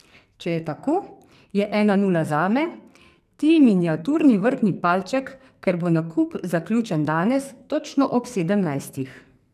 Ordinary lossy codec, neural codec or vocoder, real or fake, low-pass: none; codec, 44.1 kHz, 2.6 kbps, SNAC; fake; 14.4 kHz